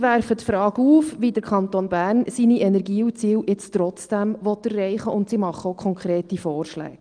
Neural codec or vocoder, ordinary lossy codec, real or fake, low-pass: none; Opus, 24 kbps; real; 9.9 kHz